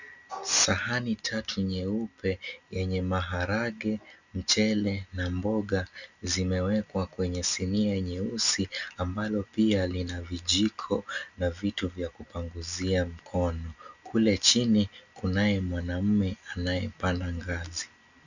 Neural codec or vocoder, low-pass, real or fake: none; 7.2 kHz; real